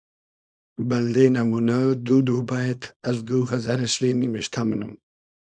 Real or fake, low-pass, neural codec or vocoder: fake; 9.9 kHz; codec, 24 kHz, 0.9 kbps, WavTokenizer, small release